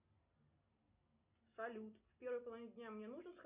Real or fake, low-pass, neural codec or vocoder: real; 3.6 kHz; none